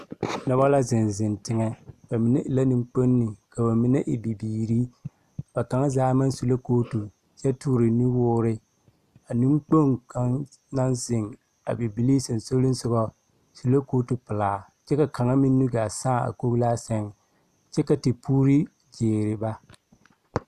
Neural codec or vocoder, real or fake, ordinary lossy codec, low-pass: none; real; Opus, 64 kbps; 14.4 kHz